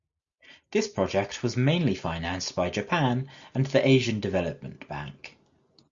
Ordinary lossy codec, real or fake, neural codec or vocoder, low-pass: Opus, 64 kbps; real; none; 7.2 kHz